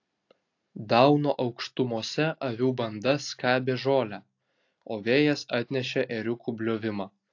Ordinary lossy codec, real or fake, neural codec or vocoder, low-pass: AAC, 48 kbps; real; none; 7.2 kHz